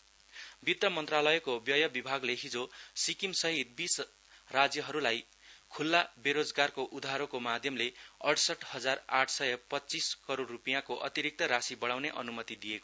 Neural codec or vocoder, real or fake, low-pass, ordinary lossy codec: none; real; none; none